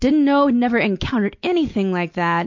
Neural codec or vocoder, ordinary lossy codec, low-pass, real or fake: none; MP3, 48 kbps; 7.2 kHz; real